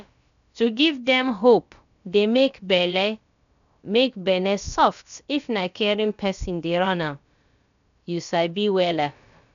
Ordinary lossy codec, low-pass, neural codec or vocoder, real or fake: none; 7.2 kHz; codec, 16 kHz, about 1 kbps, DyCAST, with the encoder's durations; fake